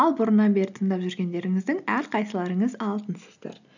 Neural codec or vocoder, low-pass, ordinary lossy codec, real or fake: none; 7.2 kHz; none; real